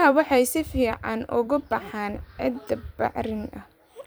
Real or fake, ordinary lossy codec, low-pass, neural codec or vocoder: fake; none; none; vocoder, 44.1 kHz, 128 mel bands every 256 samples, BigVGAN v2